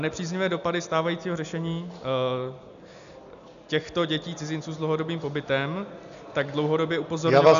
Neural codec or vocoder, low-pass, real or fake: none; 7.2 kHz; real